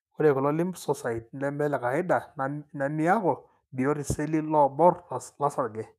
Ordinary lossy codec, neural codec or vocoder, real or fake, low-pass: none; autoencoder, 48 kHz, 128 numbers a frame, DAC-VAE, trained on Japanese speech; fake; 14.4 kHz